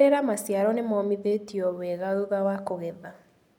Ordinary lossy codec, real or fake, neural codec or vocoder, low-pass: MP3, 96 kbps; real; none; 19.8 kHz